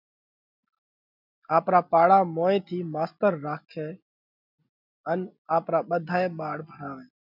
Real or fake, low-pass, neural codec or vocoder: real; 5.4 kHz; none